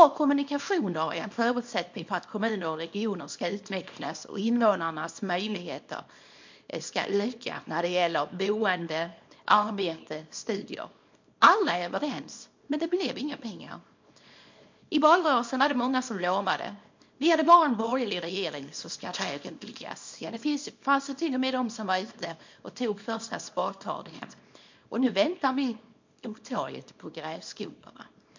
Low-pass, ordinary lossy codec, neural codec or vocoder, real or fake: 7.2 kHz; MP3, 48 kbps; codec, 24 kHz, 0.9 kbps, WavTokenizer, small release; fake